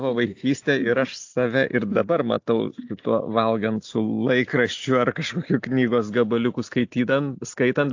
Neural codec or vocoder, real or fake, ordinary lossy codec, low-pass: none; real; AAC, 48 kbps; 7.2 kHz